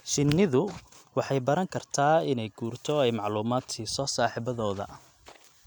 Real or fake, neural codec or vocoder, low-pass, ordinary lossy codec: real; none; 19.8 kHz; none